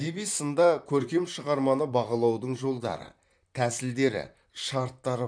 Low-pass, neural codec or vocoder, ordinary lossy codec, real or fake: 9.9 kHz; vocoder, 44.1 kHz, 128 mel bands, Pupu-Vocoder; none; fake